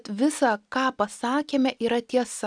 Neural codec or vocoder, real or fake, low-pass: none; real; 9.9 kHz